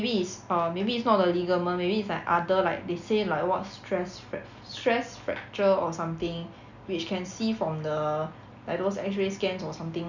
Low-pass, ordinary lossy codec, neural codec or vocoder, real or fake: 7.2 kHz; none; none; real